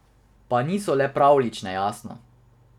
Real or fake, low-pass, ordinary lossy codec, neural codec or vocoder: real; 19.8 kHz; none; none